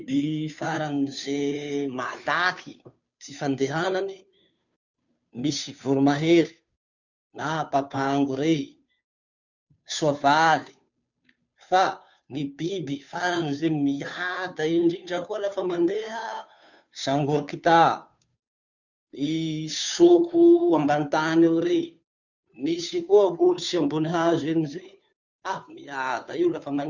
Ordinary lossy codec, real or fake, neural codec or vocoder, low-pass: none; fake; codec, 16 kHz, 2 kbps, FunCodec, trained on Chinese and English, 25 frames a second; 7.2 kHz